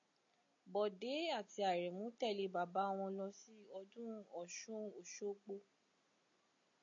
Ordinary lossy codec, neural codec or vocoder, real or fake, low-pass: AAC, 48 kbps; none; real; 7.2 kHz